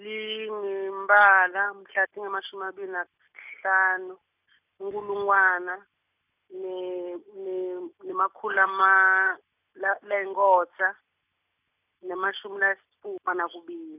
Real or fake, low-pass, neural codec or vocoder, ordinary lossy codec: real; 3.6 kHz; none; none